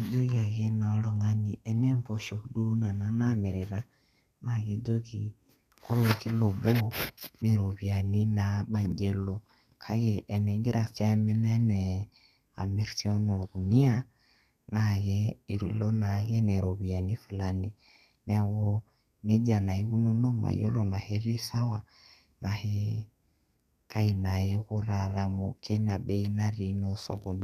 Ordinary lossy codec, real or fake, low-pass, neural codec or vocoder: none; fake; 14.4 kHz; codec, 32 kHz, 1.9 kbps, SNAC